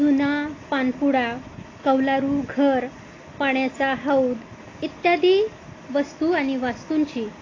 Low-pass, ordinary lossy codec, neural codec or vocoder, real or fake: 7.2 kHz; AAC, 32 kbps; none; real